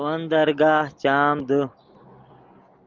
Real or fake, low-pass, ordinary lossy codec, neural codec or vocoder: real; 7.2 kHz; Opus, 24 kbps; none